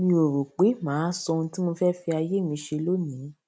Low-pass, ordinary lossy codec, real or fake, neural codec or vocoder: none; none; real; none